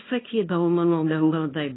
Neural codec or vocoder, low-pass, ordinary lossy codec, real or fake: codec, 24 kHz, 0.9 kbps, WavTokenizer, small release; 7.2 kHz; AAC, 16 kbps; fake